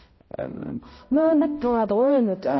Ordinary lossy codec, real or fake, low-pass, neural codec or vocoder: MP3, 24 kbps; fake; 7.2 kHz; codec, 16 kHz, 0.5 kbps, X-Codec, HuBERT features, trained on balanced general audio